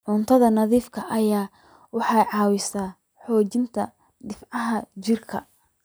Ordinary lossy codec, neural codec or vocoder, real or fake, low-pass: none; none; real; none